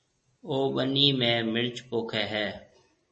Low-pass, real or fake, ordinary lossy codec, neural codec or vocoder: 10.8 kHz; real; MP3, 32 kbps; none